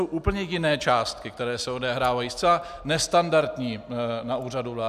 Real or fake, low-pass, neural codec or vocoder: real; 14.4 kHz; none